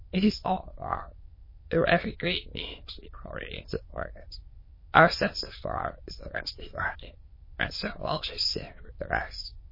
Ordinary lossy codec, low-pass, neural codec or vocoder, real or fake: MP3, 24 kbps; 5.4 kHz; autoencoder, 22.05 kHz, a latent of 192 numbers a frame, VITS, trained on many speakers; fake